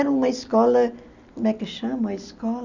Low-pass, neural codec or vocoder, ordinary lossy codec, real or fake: 7.2 kHz; none; none; real